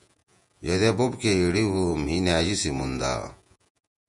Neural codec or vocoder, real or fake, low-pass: vocoder, 48 kHz, 128 mel bands, Vocos; fake; 10.8 kHz